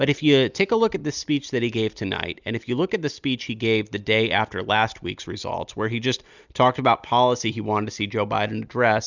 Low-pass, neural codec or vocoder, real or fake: 7.2 kHz; none; real